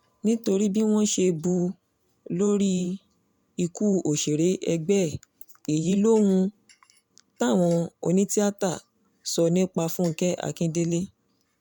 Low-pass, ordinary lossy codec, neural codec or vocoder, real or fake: 19.8 kHz; none; vocoder, 44.1 kHz, 128 mel bands every 512 samples, BigVGAN v2; fake